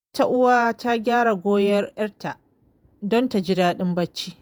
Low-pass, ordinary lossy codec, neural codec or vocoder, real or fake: none; none; vocoder, 48 kHz, 128 mel bands, Vocos; fake